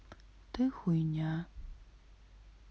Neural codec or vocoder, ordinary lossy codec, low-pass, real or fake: none; none; none; real